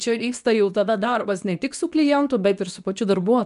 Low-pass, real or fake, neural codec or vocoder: 10.8 kHz; fake; codec, 24 kHz, 0.9 kbps, WavTokenizer, medium speech release version 2